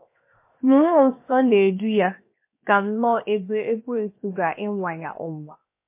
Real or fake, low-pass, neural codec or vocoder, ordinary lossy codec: fake; 3.6 kHz; codec, 16 kHz, 0.7 kbps, FocalCodec; MP3, 24 kbps